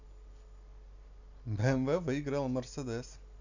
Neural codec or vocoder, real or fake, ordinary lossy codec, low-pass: none; real; none; 7.2 kHz